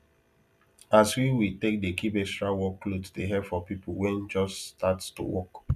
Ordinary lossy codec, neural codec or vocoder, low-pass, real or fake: none; none; 14.4 kHz; real